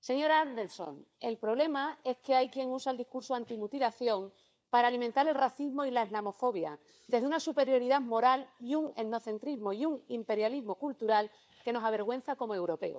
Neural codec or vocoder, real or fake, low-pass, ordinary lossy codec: codec, 16 kHz, 4 kbps, FunCodec, trained on LibriTTS, 50 frames a second; fake; none; none